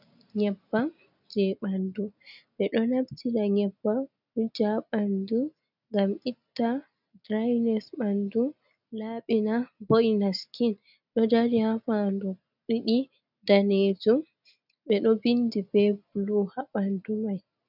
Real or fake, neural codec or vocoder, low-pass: real; none; 5.4 kHz